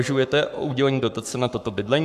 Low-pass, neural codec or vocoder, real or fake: 14.4 kHz; codec, 44.1 kHz, 7.8 kbps, Pupu-Codec; fake